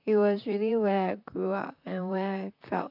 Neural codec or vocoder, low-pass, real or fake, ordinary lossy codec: vocoder, 44.1 kHz, 128 mel bands, Pupu-Vocoder; 5.4 kHz; fake; none